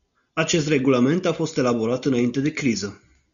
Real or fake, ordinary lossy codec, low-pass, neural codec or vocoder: real; MP3, 96 kbps; 7.2 kHz; none